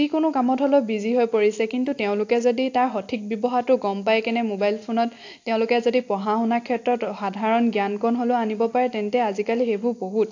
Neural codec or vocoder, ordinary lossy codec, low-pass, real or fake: none; AAC, 48 kbps; 7.2 kHz; real